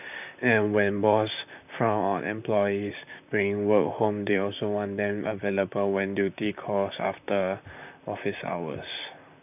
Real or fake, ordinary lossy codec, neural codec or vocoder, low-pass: fake; none; vocoder, 44.1 kHz, 128 mel bands, Pupu-Vocoder; 3.6 kHz